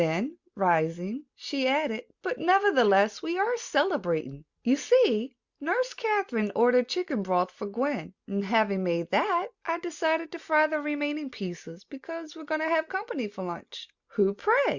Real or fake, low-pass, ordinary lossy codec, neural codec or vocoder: real; 7.2 kHz; Opus, 64 kbps; none